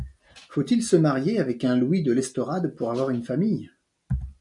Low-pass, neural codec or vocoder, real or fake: 10.8 kHz; none; real